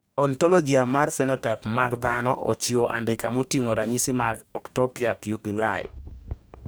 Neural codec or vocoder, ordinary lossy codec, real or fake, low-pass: codec, 44.1 kHz, 2.6 kbps, DAC; none; fake; none